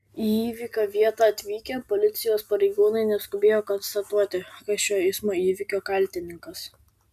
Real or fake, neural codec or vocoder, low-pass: real; none; 14.4 kHz